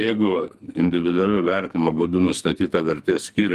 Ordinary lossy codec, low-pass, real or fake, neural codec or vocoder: Opus, 32 kbps; 14.4 kHz; fake; codec, 44.1 kHz, 2.6 kbps, SNAC